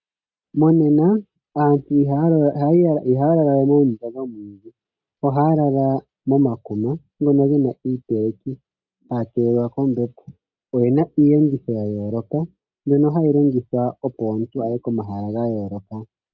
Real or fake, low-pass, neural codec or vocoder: real; 7.2 kHz; none